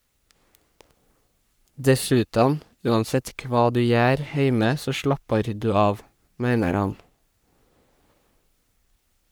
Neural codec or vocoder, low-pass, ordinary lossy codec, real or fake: codec, 44.1 kHz, 3.4 kbps, Pupu-Codec; none; none; fake